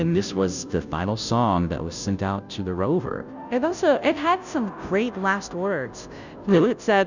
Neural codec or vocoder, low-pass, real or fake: codec, 16 kHz, 0.5 kbps, FunCodec, trained on Chinese and English, 25 frames a second; 7.2 kHz; fake